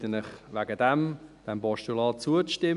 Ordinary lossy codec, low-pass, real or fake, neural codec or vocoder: none; 10.8 kHz; real; none